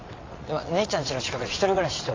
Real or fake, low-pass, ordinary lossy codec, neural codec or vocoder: fake; 7.2 kHz; AAC, 32 kbps; vocoder, 22.05 kHz, 80 mel bands, WaveNeXt